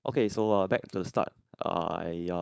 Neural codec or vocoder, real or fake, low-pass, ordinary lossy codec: codec, 16 kHz, 4.8 kbps, FACodec; fake; none; none